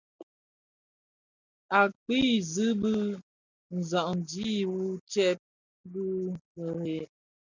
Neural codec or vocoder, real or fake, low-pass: none; real; 7.2 kHz